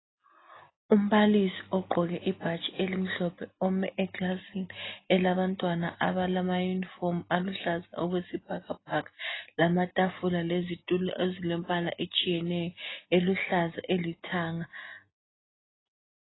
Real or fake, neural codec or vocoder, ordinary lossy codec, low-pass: real; none; AAC, 16 kbps; 7.2 kHz